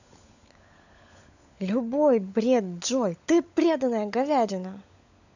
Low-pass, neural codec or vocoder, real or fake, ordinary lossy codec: 7.2 kHz; codec, 16 kHz, 16 kbps, FunCodec, trained on LibriTTS, 50 frames a second; fake; none